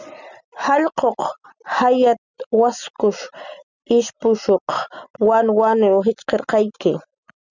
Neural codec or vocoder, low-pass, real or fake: none; 7.2 kHz; real